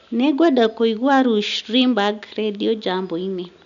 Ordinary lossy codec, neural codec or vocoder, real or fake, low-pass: none; none; real; 7.2 kHz